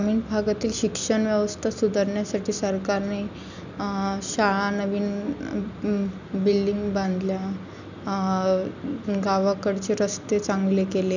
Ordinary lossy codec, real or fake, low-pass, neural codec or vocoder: none; real; 7.2 kHz; none